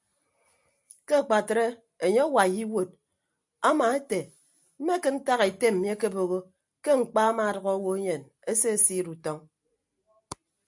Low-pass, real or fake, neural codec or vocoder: 10.8 kHz; real; none